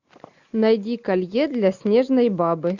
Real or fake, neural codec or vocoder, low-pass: real; none; 7.2 kHz